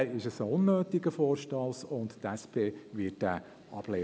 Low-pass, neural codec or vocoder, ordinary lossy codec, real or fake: none; none; none; real